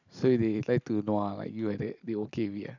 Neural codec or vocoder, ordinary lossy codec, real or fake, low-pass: none; none; real; 7.2 kHz